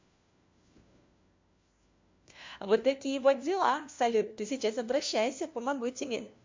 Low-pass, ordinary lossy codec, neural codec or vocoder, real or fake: 7.2 kHz; MP3, 48 kbps; codec, 16 kHz, 1 kbps, FunCodec, trained on LibriTTS, 50 frames a second; fake